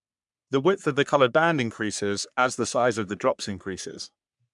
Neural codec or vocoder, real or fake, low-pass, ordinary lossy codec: codec, 44.1 kHz, 3.4 kbps, Pupu-Codec; fake; 10.8 kHz; MP3, 96 kbps